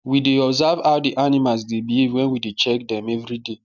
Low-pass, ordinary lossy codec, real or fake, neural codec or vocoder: 7.2 kHz; none; real; none